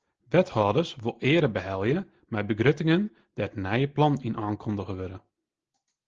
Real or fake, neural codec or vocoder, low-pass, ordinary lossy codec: real; none; 7.2 kHz; Opus, 16 kbps